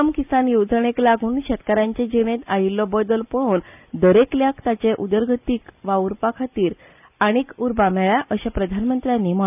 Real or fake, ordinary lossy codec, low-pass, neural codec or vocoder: real; none; 3.6 kHz; none